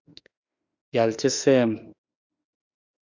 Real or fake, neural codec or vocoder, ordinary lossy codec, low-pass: fake; autoencoder, 48 kHz, 32 numbers a frame, DAC-VAE, trained on Japanese speech; Opus, 64 kbps; 7.2 kHz